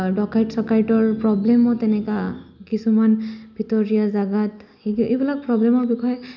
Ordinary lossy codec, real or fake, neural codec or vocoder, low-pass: none; real; none; 7.2 kHz